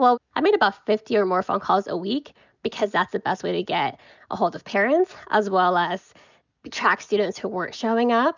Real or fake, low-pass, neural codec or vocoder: real; 7.2 kHz; none